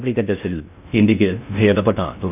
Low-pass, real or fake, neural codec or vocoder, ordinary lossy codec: 3.6 kHz; fake; codec, 16 kHz in and 24 kHz out, 0.6 kbps, FocalCodec, streaming, 2048 codes; none